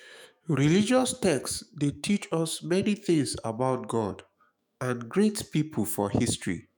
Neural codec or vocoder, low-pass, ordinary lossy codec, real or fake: autoencoder, 48 kHz, 128 numbers a frame, DAC-VAE, trained on Japanese speech; none; none; fake